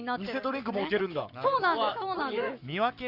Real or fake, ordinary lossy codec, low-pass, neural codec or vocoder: fake; Opus, 64 kbps; 5.4 kHz; codec, 44.1 kHz, 7.8 kbps, Pupu-Codec